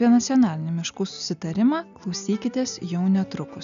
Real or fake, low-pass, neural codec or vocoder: real; 7.2 kHz; none